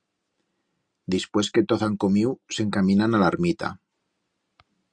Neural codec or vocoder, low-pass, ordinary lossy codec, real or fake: none; 9.9 kHz; Opus, 64 kbps; real